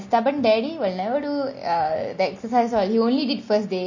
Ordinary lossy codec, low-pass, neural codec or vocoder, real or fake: MP3, 32 kbps; 7.2 kHz; none; real